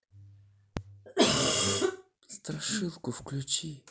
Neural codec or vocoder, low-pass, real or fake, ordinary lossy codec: none; none; real; none